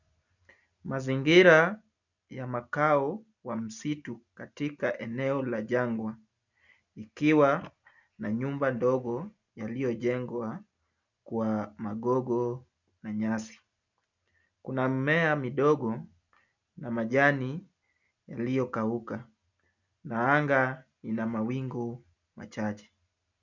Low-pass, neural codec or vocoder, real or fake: 7.2 kHz; none; real